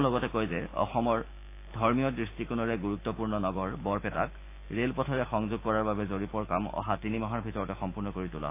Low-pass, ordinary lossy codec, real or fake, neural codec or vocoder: 3.6 kHz; AAC, 24 kbps; fake; autoencoder, 48 kHz, 128 numbers a frame, DAC-VAE, trained on Japanese speech